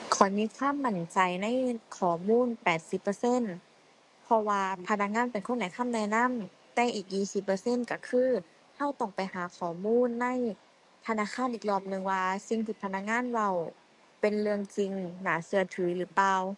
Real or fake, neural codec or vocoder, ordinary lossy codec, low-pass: fake; codec, 44.1 kHz, 7.8 kbps, DAC; MP3, 64 kbps; 10.8 kHz